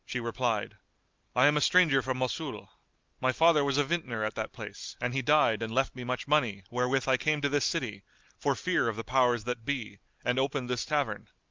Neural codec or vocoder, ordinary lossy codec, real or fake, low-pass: none; Opus, 32 kbps; real; 7.2 kHz